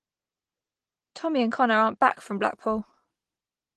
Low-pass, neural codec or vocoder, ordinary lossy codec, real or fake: 10.8 kHz; none; Opus, 16 kbps; real